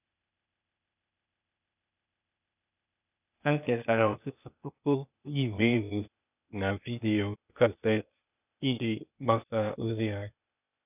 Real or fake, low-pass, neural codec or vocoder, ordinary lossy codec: fake; 3.6 kHz; codec, 16 kHz, 0.8 kbps, ZipCodec; none